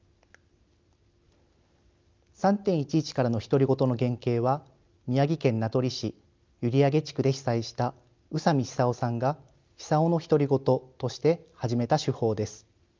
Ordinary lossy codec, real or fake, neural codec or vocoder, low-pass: Opus, 24 kbps; real; none; 7.2 kHz